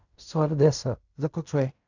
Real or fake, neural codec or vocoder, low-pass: fake; codec, 16 kHz in and 24 kHz out, 0.4 kbps, LongCat-Audio-Codec, fine tuned four codebook decoder; 7.2 kHz